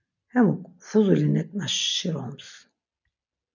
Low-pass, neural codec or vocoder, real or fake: 7.2 kHz; none; real